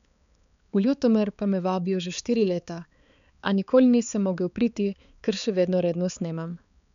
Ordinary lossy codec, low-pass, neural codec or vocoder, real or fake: none; 7.2 kHz; codec, 16 kHz, 4 kbps, X-Codec, HuBERT features, trained on balanced general audio; fake